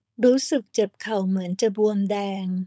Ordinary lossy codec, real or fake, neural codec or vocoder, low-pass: none; fake; codec, 16 kHz, 4.8 kbps, FACodec; none